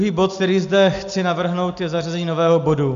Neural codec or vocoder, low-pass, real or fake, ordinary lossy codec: none; 7.2 kHz; real; MP3, 64 kbps